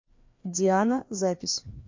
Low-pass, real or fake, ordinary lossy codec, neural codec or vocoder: 7.2 kHz; fake; MP3, 48 kbps; codec, 16 kHz, 2 kbps, FreqCodec, larger model